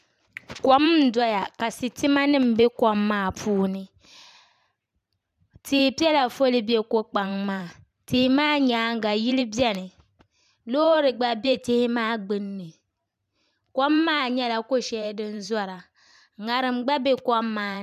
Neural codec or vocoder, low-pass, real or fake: vocoder, 44.1 kHz, 128 mel bands every 512 samples, BigVGAN v2; 14.4 kHz; fake